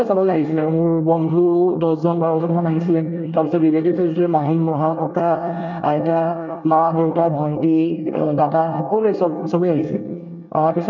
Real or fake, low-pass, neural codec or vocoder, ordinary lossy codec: fake; 7.2 kHz; codec, 24 kHz, 1 kbps, SNAC; none